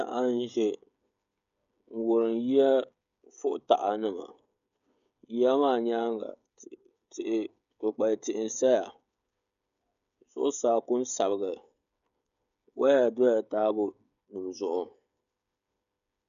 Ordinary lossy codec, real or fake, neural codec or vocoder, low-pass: AAC, 96 kbps; fake; codec, 16 kHz, 16 kbps, FreqCodec, smaller model; 7.2 kHz